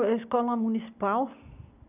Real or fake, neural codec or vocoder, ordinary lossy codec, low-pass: real; none; none; 3.6 kHz